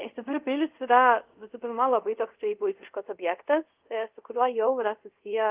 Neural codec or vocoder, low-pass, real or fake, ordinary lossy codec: codec, 24 kHz, 0.5 kbps, DualCodec; 3.6 kHz; fake; Opus, 32 kbps